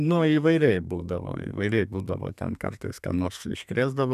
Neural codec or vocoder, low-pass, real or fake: codec, 32 kHz, 1.9 kbps, SNAC; 14.4 kHz; fake